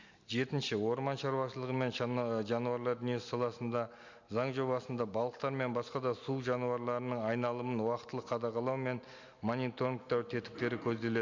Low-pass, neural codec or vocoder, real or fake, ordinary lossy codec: 7.2 kHz; none; real; MP3, 64 kbps